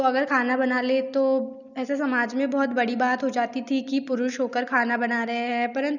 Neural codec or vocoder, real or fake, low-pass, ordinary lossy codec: none; real; 7.2 kHz; none